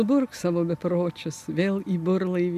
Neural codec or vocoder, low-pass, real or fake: none; 14.4 kHz; real